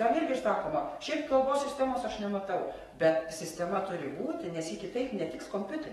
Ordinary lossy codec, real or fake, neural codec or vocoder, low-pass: AAC, 32 kbps; fake; codec, 44.1 kHz, 7.8 kbps, DAC; 19.8 kHz